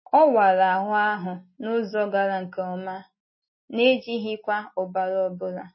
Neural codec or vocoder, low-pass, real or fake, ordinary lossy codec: none; 7.2 kHz; real; MP3, 24 kbps